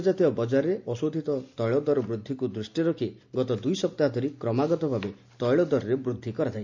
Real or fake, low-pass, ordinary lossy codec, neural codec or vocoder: real; 7.2 kHz; MP3, 64 kbps; none